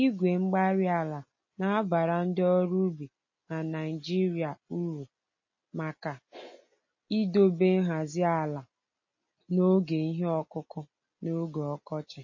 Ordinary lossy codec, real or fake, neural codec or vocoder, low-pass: MP3, 32 kbps; real; none; 7.2 kHz